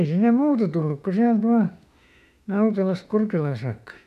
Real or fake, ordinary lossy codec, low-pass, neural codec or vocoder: fake; none; 14.4 kHz; autoencoder, 48 kHz, 32 numbers a frame, DAC-VAE, trained on Japanese speech